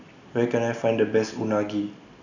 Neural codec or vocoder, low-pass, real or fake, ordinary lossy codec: none; 7.2 kHz; real; none